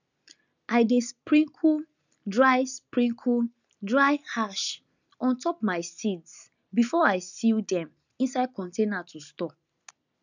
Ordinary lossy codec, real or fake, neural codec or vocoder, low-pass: none; real; none; 7.2 kHz